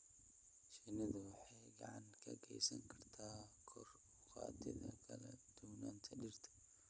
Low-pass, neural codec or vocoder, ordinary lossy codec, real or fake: none; none; none; real